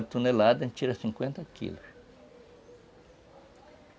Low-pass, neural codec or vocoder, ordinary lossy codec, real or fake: none; none; none; real